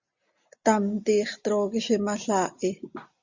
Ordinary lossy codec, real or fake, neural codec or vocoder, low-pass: Opus, 64 kbps; real; none; 7.2 kHz